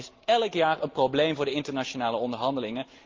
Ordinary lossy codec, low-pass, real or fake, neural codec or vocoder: Opus, 32 kbps; 7.2 kHz; real; none